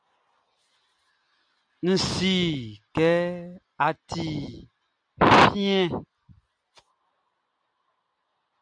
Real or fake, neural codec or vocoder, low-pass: real; none; 9.9 kHz